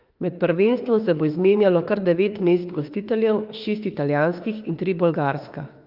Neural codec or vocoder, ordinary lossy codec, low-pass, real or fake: autoencoder, 48 kHz, 32 numbers a frame, DAC-VAE, trained on Japanese speech; Opus, 24 kbps; 5.4 kHz; fake